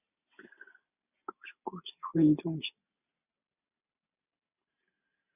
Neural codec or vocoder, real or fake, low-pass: none; real; 3.6 kHz